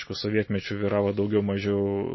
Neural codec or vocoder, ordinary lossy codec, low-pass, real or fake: none; MP3, 24 kbps; 7.2 kHz; real